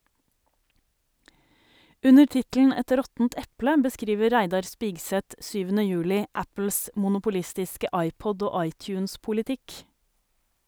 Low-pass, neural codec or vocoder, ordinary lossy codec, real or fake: none; none; none; real